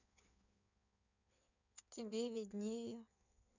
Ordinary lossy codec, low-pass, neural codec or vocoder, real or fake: none; 7.2 kHz; codec, 16 kHz in and 24 kHz out, 1.1 kbps, FireRedTTS-2 codec; fake